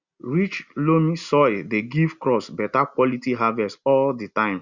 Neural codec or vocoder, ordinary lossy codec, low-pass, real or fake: none; Opus, 64 kbps; 7.2 kHz; real